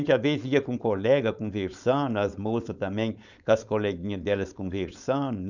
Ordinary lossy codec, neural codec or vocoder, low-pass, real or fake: none; codec, 16 kHz, 4.8 kbps, FACodec; 7.2 kHz; fake